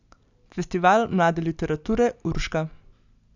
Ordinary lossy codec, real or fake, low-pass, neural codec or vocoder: none; real; 7.2 kHz; none